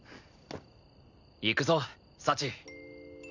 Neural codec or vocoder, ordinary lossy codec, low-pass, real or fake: none; none; 7.2 kHz; real